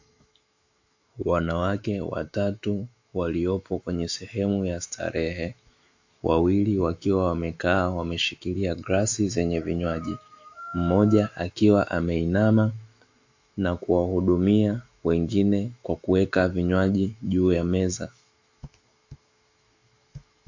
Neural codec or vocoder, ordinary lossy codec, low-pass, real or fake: autoencoder, 48 kHz, 128 numbers a frame, DAC-VAE, trained on Japanese speech; AAC, 48 kbps; 7.2 kHz; fake